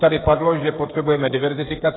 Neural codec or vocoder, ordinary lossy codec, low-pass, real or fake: codec, 16 kHz, 16 kbps, FreqCodec, smaller model; AAC, 16 kbps; 7.2 kHz; fake